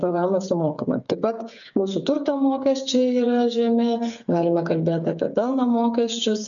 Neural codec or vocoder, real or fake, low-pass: codec, 16 kHz, 8 kbps, FreqCodec, smaller model; fake; 7.2 kHz